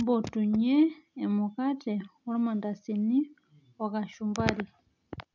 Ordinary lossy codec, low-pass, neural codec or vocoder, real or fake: none; 7.2 kHz; none; real